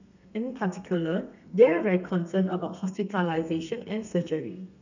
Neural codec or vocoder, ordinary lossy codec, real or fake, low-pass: codec, 32 kHz, 1.9 kbps, SNAC; none; fake; 7.2 kHz